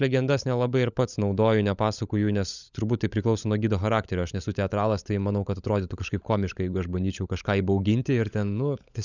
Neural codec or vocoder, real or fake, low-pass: codec, 16 kHz, 16 kbps, FunCodec, trained on LibriTTS, 50 frames a second; fake; 7.2 kHz